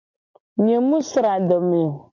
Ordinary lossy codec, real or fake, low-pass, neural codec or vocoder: MP3, 48 kbps; real; 7.2 kHz; none